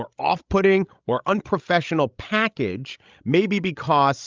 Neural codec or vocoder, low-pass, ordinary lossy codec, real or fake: codec, 16 kHz, 16 kbps, FreqCodec, larger model; 7.2 kHz; Opus, 24 kbps; fake